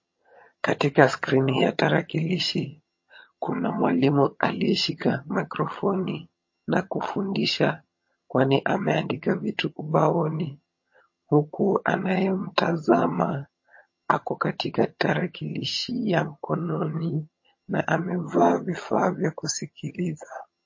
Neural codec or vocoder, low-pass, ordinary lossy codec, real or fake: vocoder, 22.05 kHz, 80 mel bands, HiFi-GAN; 7.2 kHz; MP3, 32 kbps; fake